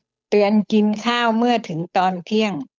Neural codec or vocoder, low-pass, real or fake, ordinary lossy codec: codec, 16 kHz, 8 kbps, FunCodec, trained on Chinese and English, 25 frames a second; none; fake; none